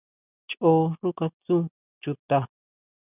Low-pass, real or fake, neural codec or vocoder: 3.6 kHz; real; none